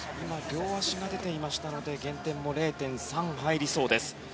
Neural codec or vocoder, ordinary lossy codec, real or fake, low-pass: none; none; real; none